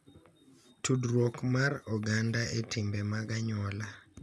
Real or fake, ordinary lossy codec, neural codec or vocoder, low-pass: real; none; none; none